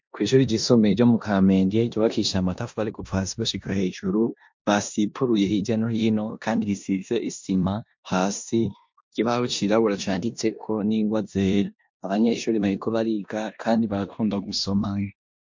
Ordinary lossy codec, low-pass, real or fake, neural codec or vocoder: MP3, 48 kbps; 7.2 kHz; fake; codec, 16 kHz in and 24 kHz out, 0.9 kbps, LongCat-Audio-Codec, four codebook decoder